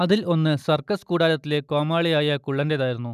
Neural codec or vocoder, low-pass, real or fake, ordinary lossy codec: none; 14.4 kHz; real; none